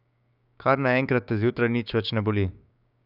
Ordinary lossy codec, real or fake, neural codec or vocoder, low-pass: none; fake; codec, 16 kHz, 6 kbps, DAC; 5.4 kHz